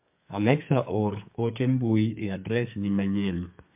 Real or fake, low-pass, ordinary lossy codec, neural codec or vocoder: fake; 3.6 kHz; MP3, 32 kbps; codec, 32 kHz, 1.9 kbps, SNAC